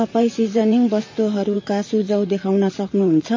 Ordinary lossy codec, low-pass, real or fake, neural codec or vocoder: MP3, 32 kbps; 7.2 kHz; fake; vocoder, 44.1 kHz, 128 mel bands, Pupu-Vocoder